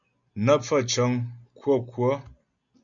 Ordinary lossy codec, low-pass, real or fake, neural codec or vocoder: MP3, 96 kbps; 7.2 kHz; real; none